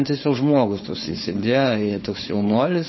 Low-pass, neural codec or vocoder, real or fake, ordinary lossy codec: 7.2 kHz; codec, 16 kHz, 4.8 kbps, FACodec; fake; MP3, 24 kbps